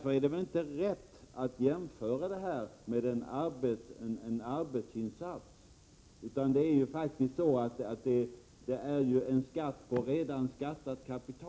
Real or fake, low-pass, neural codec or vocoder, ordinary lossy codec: real; none; none; none